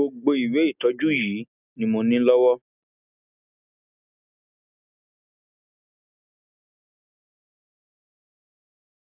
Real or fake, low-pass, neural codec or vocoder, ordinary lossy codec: real; 3.6 kHz; none; none